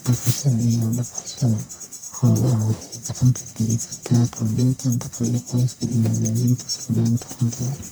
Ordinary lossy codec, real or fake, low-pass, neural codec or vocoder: none; fake; none; codec, 44.1 kHz, 1.7 kbps, Pupu-Codec